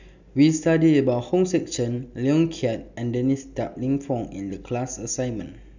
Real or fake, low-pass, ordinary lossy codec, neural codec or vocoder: real; 7.2 kHz; none; none